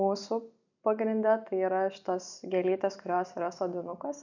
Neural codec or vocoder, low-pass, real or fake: none; 7.2 kHz; real